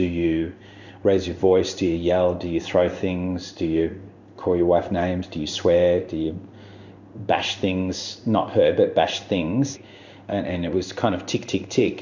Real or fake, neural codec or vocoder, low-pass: fake; codec, 16 kHz in and 24 kHz out, 1 kbps, XY-Tokenizer; 7.2 kHz